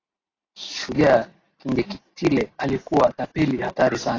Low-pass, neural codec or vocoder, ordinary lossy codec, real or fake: 7.2 kHz; none; AAC, 32 kbps; real